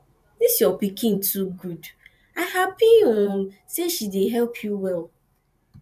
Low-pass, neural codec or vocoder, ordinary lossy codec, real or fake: 14.4 kHz; vocoder, 44.1 kHz, 128 mel bands every 512 samples, BigVGAN v2; none; fake